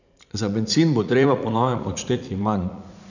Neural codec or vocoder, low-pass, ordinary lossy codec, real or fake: vocoder, 44.1 kHz, 80 mel bands, Vocos; 7.2 kHz; none; fake